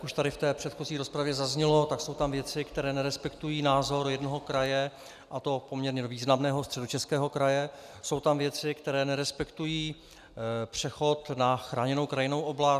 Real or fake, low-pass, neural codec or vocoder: real; 14.4 kHz; none